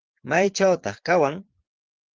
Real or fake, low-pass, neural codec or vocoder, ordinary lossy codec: fake; 7.2 kHz; vocoder, 22.05 kHz, 80 mel bands, Vocos; Opus, 16 kbps